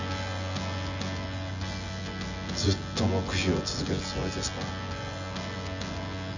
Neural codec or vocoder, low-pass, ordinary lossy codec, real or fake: vocoder, 24 kHz, 100 mel bands, Vocos; 7.2 kHz; none; fake